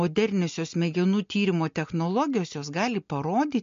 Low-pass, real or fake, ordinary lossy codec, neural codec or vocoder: 7.2 kHz; real; MP3, 48 kbps; none